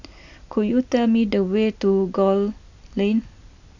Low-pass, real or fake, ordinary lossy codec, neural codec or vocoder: 7.2 kHz; real; none; none